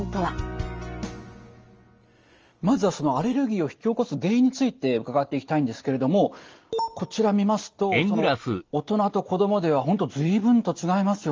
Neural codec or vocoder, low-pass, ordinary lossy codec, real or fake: none; 7.2 kHz; Opus, 24 kbps; real